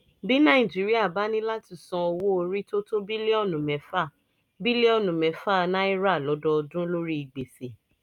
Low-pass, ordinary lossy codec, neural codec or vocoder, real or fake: 19.8 kHz; none; none; real